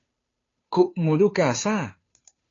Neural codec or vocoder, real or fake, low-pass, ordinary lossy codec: codec, 16 kHz, 2 kbps, FunCodec, trained on Chinese and English, 25 frames a second; fake; 7.2 kHz; AAC, 32 kbps